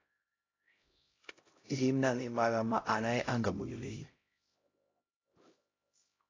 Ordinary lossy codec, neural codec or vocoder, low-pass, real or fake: AAC, 32 kbps; codec, 16 kHz, 0.5 kbps, X-Codec, HuBERT features, trained on LibriSpeech; 7.2 kHz; fake